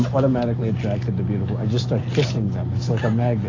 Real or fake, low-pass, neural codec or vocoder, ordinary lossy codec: real; 7.2 kHz; none; AAC, 32 kbps